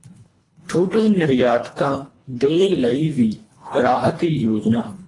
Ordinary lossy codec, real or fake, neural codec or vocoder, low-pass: AAC, 32 kbps; fake; codec, 24 kHz, 1.5 kbps, HILCodec; 10.8 kHz